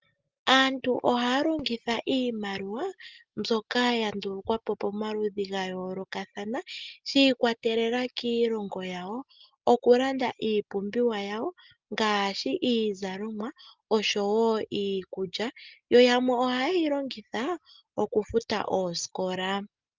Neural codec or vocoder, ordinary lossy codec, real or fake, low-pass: none; Opus, 24 kbps; real; 7.2 kHz